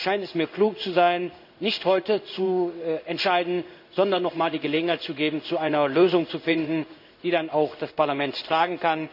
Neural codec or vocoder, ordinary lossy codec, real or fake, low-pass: codec, 16 kHz in and 24 kHz out, 1 kbps, XY-Tokenizer; none; fake; 5.4 kHz